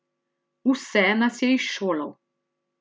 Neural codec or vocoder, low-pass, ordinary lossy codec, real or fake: none; none; none; real